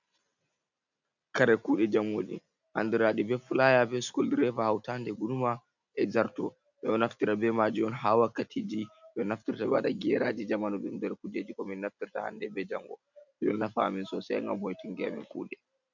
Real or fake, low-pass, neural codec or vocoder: real; 7.2 kHz; none